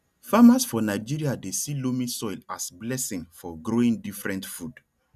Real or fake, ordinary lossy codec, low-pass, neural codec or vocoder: real; none; 14.4 kHz; none